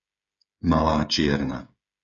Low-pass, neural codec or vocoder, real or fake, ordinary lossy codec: 7.2 kHz; codec, 16 kHz, 16 kbps, FreqCodec, smaller model; fake; MP3, 64 kbps